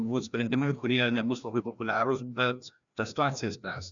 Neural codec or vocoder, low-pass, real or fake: codec, 16 kHz, 1 kbps, FreqCodec, larger model; 7.2 kHz; fake